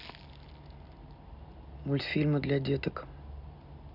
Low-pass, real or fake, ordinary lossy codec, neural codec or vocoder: 5.4 kHz; real; none; none